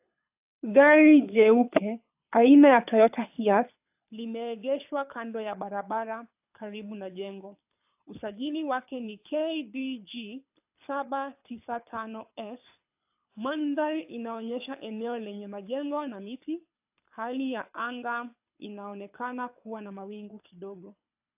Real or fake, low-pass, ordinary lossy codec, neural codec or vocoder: fake; 3.6 kHz; AAC, 32 kbps; codec, 24 kHz, 6 kbps, HILCodec